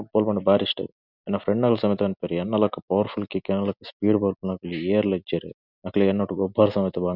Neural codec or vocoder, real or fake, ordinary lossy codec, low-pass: none; real; none; 5.4 kHz